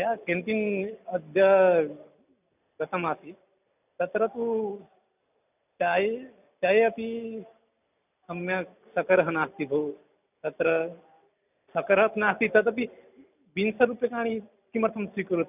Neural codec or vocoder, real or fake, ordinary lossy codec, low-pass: none; real; none; 3.6 kHz